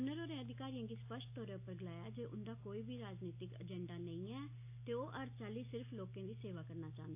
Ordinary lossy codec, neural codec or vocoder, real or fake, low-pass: AAC, 32 kbps; none; real; 3.6 kHz